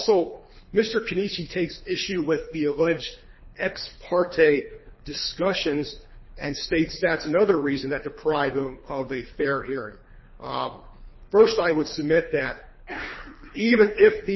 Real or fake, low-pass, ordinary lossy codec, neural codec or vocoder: fake; 7.2 kHz; MP3, 24 kbps; codec, 24 kHz, 3 kbps, HILCodec